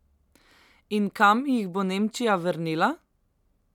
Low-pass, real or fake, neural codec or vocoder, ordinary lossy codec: 19.8 kHz; real; none; none